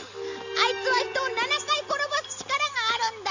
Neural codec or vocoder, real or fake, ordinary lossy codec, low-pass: none; real; none; 7.2 kHz